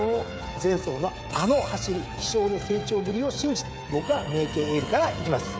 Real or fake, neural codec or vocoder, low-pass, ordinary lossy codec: fake; codec, 16 kHz, 16 kbps, FreqCodec, smaller model; none; none